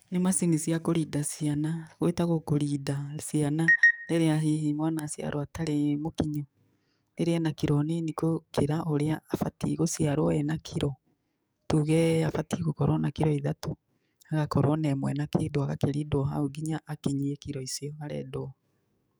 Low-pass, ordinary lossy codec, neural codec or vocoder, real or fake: none; none; codec, 44.1 kHz, 7.8 kbps, DAC; fake